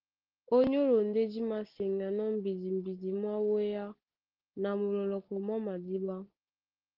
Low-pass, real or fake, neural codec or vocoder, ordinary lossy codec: 5.4 kHz; real; none; Opus, 16 kbps